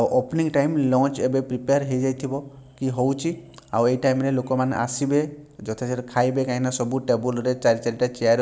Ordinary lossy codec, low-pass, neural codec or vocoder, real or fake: none; none; none; real